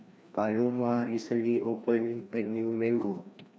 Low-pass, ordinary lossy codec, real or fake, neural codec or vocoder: none; none; fake; codec, 16 kHz, 1 kbps, FreqCodec, larger model